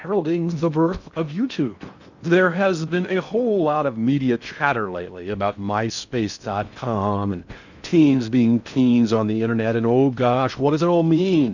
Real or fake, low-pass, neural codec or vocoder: fake; 7.2 kHz; codec, 16 kHz in and 24 kHz out, 0.6 kbps, FocalCodec, streaming, 2048 codes